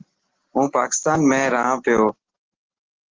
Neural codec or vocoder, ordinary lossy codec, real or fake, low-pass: none; Opus, 16 kbps; real; 7.2 kHz